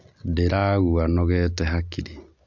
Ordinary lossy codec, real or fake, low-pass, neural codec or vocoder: MP3, 64 kbps; real; 7.2 kHz; none